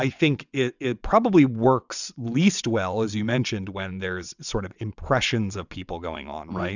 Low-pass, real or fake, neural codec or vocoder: 7.2 kHz; fake; vocoder, 22.05 kHz, 80 mel bands, WaveNeXt